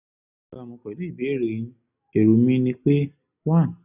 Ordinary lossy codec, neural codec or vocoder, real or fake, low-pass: none; none; real; 3.6 kHz